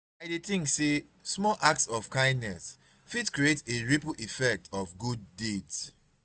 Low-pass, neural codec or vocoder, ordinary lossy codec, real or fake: none; none; none; real